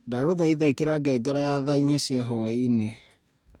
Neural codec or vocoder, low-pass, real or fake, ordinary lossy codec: codec, 44.1 kHz, 2.6 kbps, DAC; 19.8 kHz; fake; none